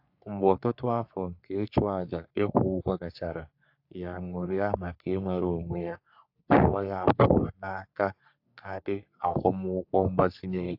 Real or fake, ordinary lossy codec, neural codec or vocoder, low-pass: fake; AAC, 48 kbps; codec, 44.1 kHz, 3.4 kbps, Pupu-Codec; 5.4 kHz